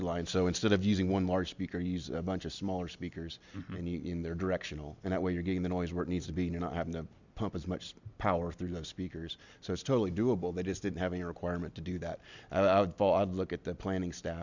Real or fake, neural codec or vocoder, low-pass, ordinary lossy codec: real; none; 7.2 kHz; Opus, 64 kbps